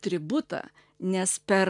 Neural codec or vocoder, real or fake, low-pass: none; real; 10.8 kHz